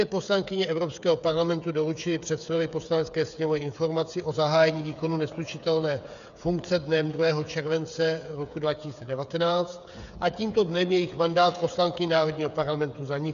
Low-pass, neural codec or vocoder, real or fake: 7.2 kHz; codec, 16 kHz, 8 kbps, FreqCodec, smaller model; fake